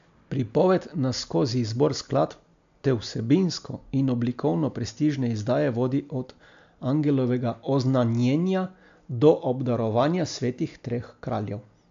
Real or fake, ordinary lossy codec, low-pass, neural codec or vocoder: real; AAC, 64 kbps; 7.2 kHz; none